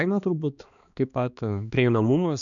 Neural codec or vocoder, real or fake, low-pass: codec, 16 kHz, 2 kbps, X-Codec, HuBERT features, trained on balanced general audio; fake; 7.2 kHz